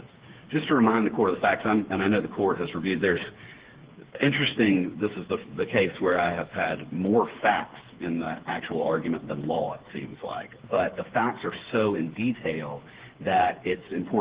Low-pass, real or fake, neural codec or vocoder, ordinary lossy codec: 3.6 kHz; fake; codec, 16 kHz, 4 kbps, FreqCodec, smaller model; Opus, 16 kbps